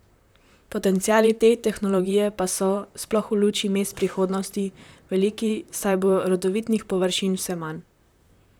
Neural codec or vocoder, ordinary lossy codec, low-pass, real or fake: vocoder, 44.1 kHz, 128 mel bands, Pupu-Vocoder; none; none; fake